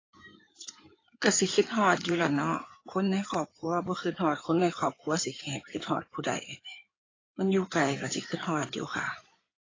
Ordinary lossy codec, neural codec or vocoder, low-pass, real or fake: AAC, 32 kbps; codec, 16 kHz in and 24 kHz out, 2.2 kbps, FireRedTTS-2 codec; 7.2 kHz; fake